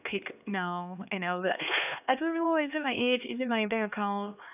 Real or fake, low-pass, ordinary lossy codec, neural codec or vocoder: fake; 3.6 kHz; none; codec, 16 kHz, 2 kbps, X-Codec, HuBERT features, trained on LibriSpeech